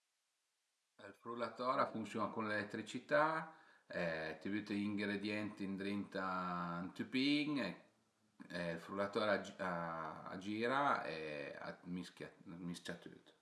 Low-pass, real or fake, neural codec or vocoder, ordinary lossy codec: none; real; none; none